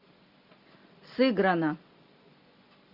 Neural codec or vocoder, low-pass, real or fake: none; 5.4 kHz; real